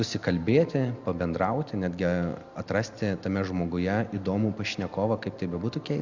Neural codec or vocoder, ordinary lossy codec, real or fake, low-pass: none; Opus, 64 kbps; real; 7.2 kHz